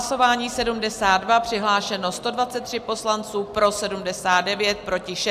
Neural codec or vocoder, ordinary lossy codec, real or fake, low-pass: none; AAC, 96 kbps; real; 14.4 kHz